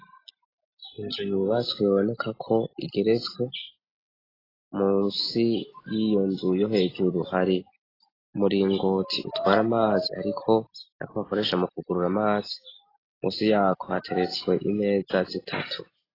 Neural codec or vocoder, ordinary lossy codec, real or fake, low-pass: none; AAC, 24 kbps; real; 5.4 kHz